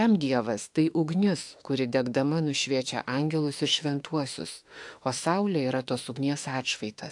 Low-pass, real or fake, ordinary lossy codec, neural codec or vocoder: 10.8 kHz; fake; AAC, 64 kbps; autoencoder, 48 kHz, 32 numbers a frame, DAC-VAE, trained on Japanese speech